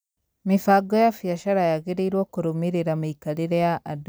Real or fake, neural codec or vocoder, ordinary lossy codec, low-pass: real; none; none; none